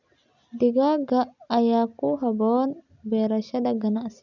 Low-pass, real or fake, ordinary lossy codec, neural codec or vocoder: 7.2 kHz; real; none; none